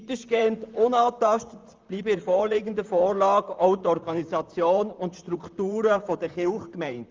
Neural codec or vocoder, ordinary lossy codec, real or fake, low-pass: vocoder, 44.1 kHz, 128 mel bands, Pupu-Vocoder; Opus, 32 kbps; fake; 7.2 kHz